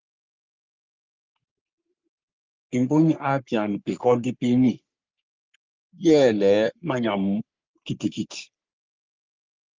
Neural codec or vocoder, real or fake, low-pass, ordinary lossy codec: codec, 44.1 kHz, 3.4 kbps, Pupu-Codec; fake; 7.2 kHz; Opus, 24 kbps